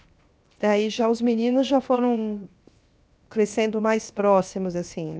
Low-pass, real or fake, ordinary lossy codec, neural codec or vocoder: none; fake; none; codec, 16 kHz, 0.7 kbps, FocalCodec